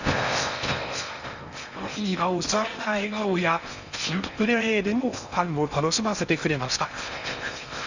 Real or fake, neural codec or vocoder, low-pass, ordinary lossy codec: fake; codec, 16 kHz in and 24 kHz out, 0.6 kbps, FocalCodec, streaming, 4096 codes; 7.2 kHz; Opus, 64 kbps